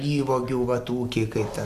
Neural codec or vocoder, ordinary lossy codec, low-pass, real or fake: none; AAC, 96 kbps; 14.4 kHz; real